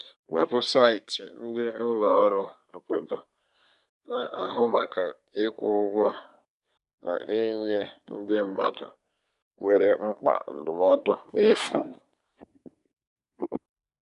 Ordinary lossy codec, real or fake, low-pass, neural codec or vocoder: none; fake; 10.8 kHz; codec, 24 kHz, 1 kbps, SNAC